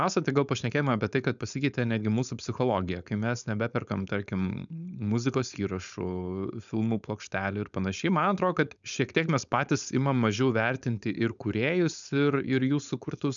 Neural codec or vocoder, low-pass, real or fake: codec, 16 kHz, 4.8 kbps, FACodec; 7.2 kHz; fake